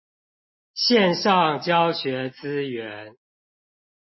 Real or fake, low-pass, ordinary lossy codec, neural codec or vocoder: real; 7.2 kHz; MP3, 24 kbps; none